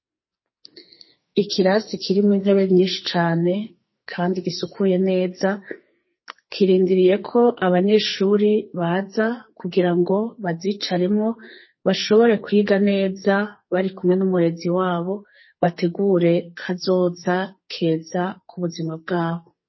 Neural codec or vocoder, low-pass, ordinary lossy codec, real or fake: codec, 44.1 kHz, 2.6 kbps, SNAC; 7.2 kHz; MP3, 24 kbps; fake